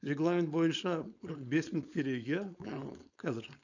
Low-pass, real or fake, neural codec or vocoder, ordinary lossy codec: 7.2 kHz; fake; codec, 16 kHz, 4.8 kbps, FACodec; none